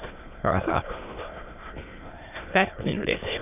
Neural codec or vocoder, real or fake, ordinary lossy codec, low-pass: autoencoder, 22.05 kHz, a latent of 192 numbers a frame, VITS, trained on many speakers; fake; none; 3.6 kHz